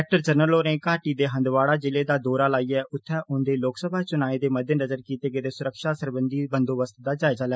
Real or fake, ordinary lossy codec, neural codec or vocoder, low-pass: real; none; none; none